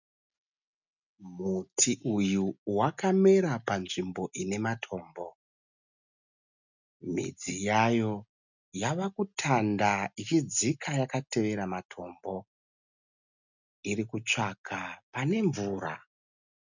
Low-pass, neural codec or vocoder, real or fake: 7.2 kHz; none; real